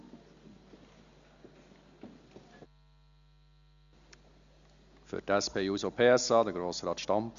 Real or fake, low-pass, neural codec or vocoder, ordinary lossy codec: real; 7.2 kHz; none; Opus, 64 kbps